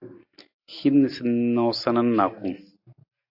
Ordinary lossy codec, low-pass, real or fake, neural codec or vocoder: AAC, 48 kbps; 5.4 kHz; real; none